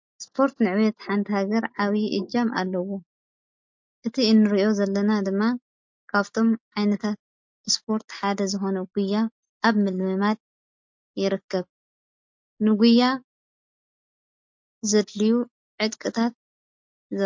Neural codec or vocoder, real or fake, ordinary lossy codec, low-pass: none; real; MP3, 48 kbps; 7.2 kHz